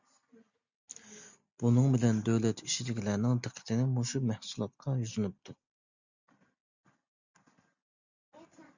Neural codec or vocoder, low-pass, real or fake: none; 7.2 kHz; real